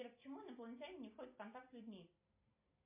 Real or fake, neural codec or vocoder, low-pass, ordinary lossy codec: real; none; 3.6 kHz; MP3, 24 kbps